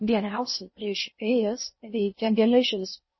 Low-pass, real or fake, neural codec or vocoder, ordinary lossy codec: 7.2 kHz; fake; codec, 16 kHz in and 24 kHz out, 0.6 kbps, FocalCodec, streaming, 4096 codes; MP3, 24 kbps